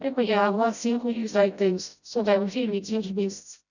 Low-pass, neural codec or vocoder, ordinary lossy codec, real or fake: 7.2 kHz; codec, 16 kHz, 0.5 kbps, FreqCodec, smaller model; none; fake